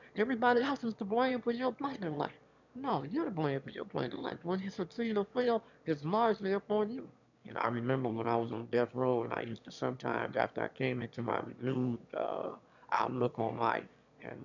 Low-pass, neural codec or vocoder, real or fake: 7.2 kHz; autoencoder, 22.05 kHz, a latent of 192 numbers a frame, VITS, trained on one speaker; fake